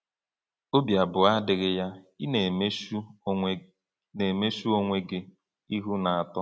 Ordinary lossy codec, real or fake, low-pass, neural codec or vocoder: none; real; none; none